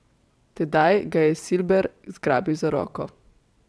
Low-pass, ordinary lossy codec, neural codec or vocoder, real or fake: none; none; vocoder, 22.05 kHz, 80 mel bands, WaveNeXt; fake